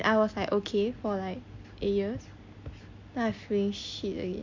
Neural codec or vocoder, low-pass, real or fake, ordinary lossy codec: none; 7.2 kHz; real; MP3, 48 kbps